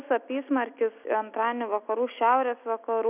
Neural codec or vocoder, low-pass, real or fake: none; 3.6 kHz; real